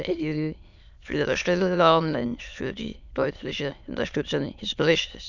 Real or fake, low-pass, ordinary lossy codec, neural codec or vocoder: fake; 7.2 kHz; none; autoencoder, 22.05 kHz, a latent of 192 numbers a frame, VITS, trained on many speakers